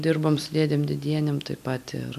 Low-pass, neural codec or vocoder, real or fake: 14.4 kHz; none; real